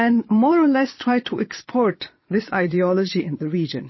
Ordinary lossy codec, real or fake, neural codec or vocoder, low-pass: MP3, 24 kbps; fake; vocoder, 22.05 kHz, 80 mel bands, Vocos; 7.2 kHz